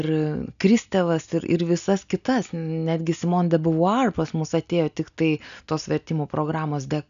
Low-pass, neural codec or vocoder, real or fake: 7.2 kHz; none; real